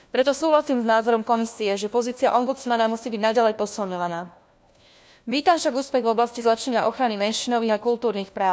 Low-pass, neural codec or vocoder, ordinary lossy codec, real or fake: none; codec, 16 kHz, 1 kbps, FunCodec, trained on LibriTTS, 50 frames a second; none; fake